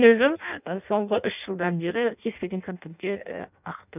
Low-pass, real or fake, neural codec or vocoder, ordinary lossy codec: 3.6 kHz; fake; codec, 16 kHz in and 24 kHz out, 0.6 kbps, FireRedTTS-2 codec; none